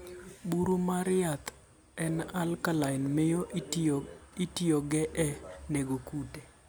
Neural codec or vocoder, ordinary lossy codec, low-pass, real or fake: none; none; none; real